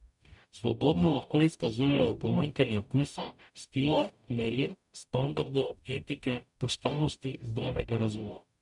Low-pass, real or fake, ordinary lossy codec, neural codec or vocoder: 10.8 kHz; fake; MP3, 96 kbps; codec, 44.1 kHz, 0.9 kbps, DAC